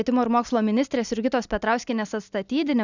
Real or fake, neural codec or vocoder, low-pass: real; none; 7.2 kHz